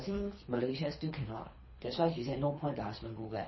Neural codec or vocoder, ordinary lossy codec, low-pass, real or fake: codec, 24 kHz, 3 kbps, HILCodec; MP3, 24 kbps; 7.2 kHz; fake